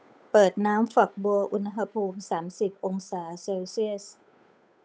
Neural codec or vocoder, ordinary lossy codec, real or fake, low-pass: codec, 16 kHz, 8 kbps, FunCodec, trained on Chinese and English, 25 frames a second; none; fake; none